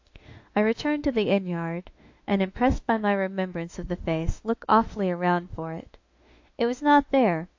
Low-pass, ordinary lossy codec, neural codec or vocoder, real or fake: 7.2 kHz; AAC, 48 kbps; autoencoder, 48 kHz, 32 numbers a frame, DAC-VAE, trained on Japanese speech; fake